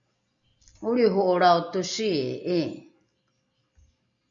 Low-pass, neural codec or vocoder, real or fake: 7.2 kHz; none; real